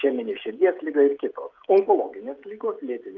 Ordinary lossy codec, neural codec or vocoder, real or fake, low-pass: Opus, 32 kbps; codec, 16 kHz, 16 kbps, FreqCodec, smaller model; fake; 7.2 kHz